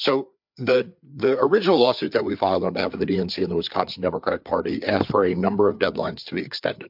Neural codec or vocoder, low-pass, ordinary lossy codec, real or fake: codec, 16 kHz, 4 kbps, FreqCodec, larger model; 5.4 kHz; MP3, 48 kbps; fake